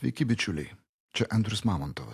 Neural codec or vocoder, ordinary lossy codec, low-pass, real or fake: none; AAC, 64 kbps; 14.4 kHz; real